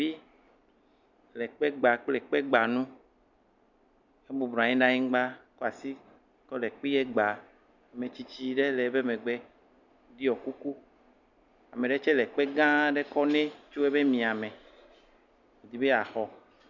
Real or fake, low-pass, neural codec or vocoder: real; 7.2 kHz; none